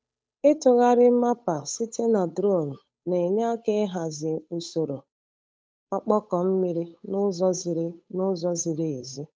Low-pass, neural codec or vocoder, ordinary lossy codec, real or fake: none; codec, 16 kHz, 8 kbps, FunCodec, trained on Chinese and English, 25 frames a second; none; fake